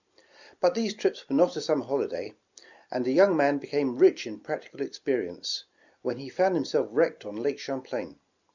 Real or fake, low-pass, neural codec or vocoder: real; 7.2 kHz; none